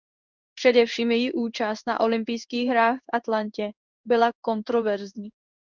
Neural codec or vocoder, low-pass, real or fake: codec, 16 kHz in and 24 kHz out, 1 kbps, XY-Tokenizer; 7.2 kHz; fake